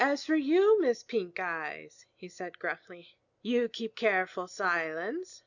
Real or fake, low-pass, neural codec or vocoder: real; 7.2 kHz; none